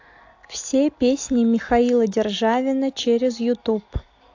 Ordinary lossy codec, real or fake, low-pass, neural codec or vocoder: AAC, 48 kbps; real; 7.2 kHz; none